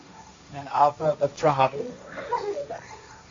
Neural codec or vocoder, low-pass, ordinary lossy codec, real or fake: codec, 16 kHz, 1.1 kbps, Voila-Tokenizer; 7.2 kHz; AAC, 48 kbps; fake